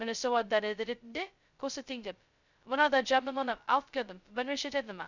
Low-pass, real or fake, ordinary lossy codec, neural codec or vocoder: 7.2 kHz; fake; none; codec, 16 kHz, 0.2 kbps, FocalCodec